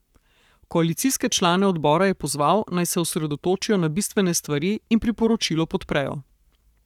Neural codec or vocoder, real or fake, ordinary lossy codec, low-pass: codec, 44.1 kHz, 7.8 kbps, Pupu-Codec; fake; none; 19.8 kHz